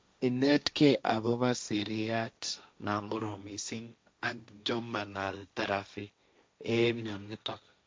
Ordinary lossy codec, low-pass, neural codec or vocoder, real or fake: none; none; codec, 16 kHz, 1.1 kbps, Voila-Tokenizer; fake